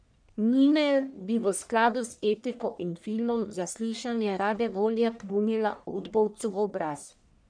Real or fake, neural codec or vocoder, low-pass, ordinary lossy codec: fake; codec, 44.1 kHz, 1.7 kbps, Pupu-Codec; 9.9 kHz; none